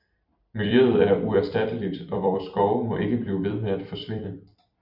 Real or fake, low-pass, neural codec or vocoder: fake; 5.4 kHz; vocoder, 44.1 kHz, 128 mel bands every 256 samples, BigVGAN v2